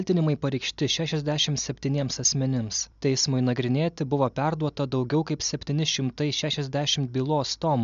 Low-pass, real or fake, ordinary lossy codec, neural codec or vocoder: 7.2 kHz; real; MP3, 96 kbps; none